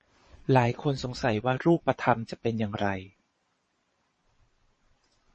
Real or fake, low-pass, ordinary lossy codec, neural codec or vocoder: fake; 9.9 kHz; MP3, 32 kbps; vocoder, 22.05 kHz, 80 mel bands, Vocos